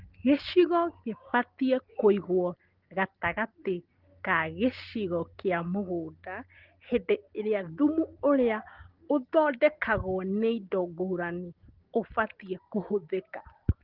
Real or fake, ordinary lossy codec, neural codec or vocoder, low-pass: real; Opus, 16 kbps; none; 5.4 kHz